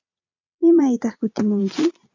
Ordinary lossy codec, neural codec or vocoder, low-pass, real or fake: AAC, 48 kbps; vocoder, 22.05 kHz, 80 mel bands, Vocos; 7.2 kHz; fake